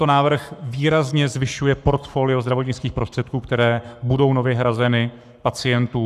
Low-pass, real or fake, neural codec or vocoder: 14.4 kHz; fake; codec, 44.1 kHz, 7.8 kbps, Pupu-Codec